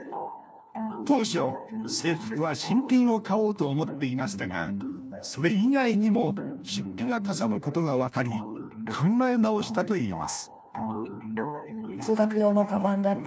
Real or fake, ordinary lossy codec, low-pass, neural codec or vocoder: fake; none; none; codec, 16 kHz, 1 kbps, FunCodec, trained on LibriTTS, 50 frames a second